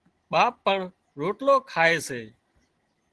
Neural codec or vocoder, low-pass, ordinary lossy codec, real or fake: none; 10.8 kHz; Opus, 16 kbps; real